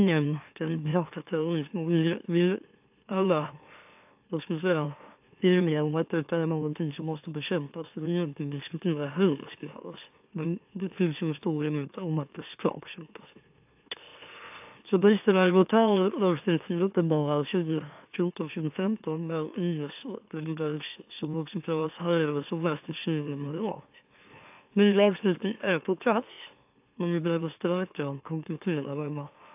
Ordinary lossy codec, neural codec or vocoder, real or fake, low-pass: AAC, 32 kbps; autoencoder, 44.1 kHz, a latent of 192 numbers a frame, MeloTTS; fake; 3.6 kHz